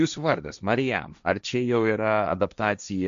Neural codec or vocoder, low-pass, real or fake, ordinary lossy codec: codec, 16 kHz, 1.1 kbps, Voila-Tokenizer; 7.2 kHz; fake; MP3, 64 kbps